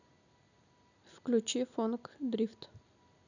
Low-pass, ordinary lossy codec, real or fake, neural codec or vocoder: 7.2 kHz; none; real; none